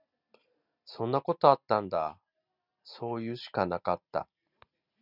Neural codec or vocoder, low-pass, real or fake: none; 5.4 kHz; real